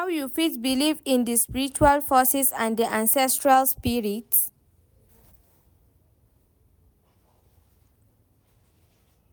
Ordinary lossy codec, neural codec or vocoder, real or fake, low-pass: none; none; real; none